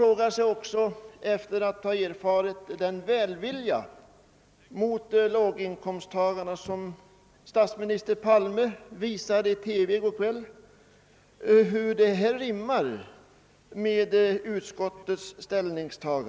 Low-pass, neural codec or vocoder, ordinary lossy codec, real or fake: none; none; none; real